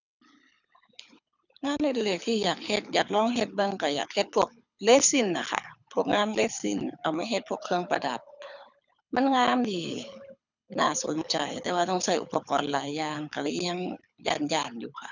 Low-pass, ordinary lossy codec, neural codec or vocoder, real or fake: 7.2 kHz; none; codec, 24 kHz, 6 kbps, HILCodec; fake